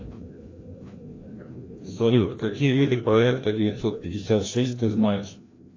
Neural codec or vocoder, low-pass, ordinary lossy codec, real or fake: codec, 16 kHz, 1 kbps, FreqCodec, larger model; 7.2 kHz; AAC, 32 kbps; fake